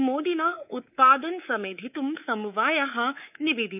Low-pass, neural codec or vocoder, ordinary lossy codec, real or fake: 3.6 kHz; codec, 24 kHz, 3.1 kbps, DualCodec; none; fake